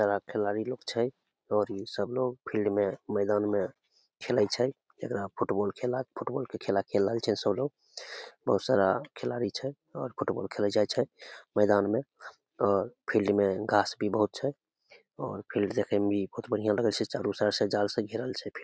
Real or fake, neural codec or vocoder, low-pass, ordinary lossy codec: real; none; none; none